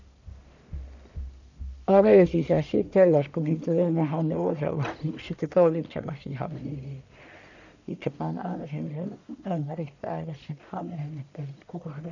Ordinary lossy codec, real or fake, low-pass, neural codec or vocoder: none; fake; 7.2 kHz; codec, 44.1 kHz, 1.7 kbps, Pupu-Codec